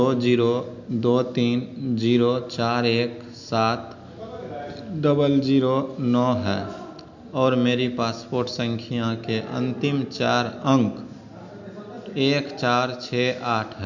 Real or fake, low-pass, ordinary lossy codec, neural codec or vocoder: real; 7.2 kHz; none; none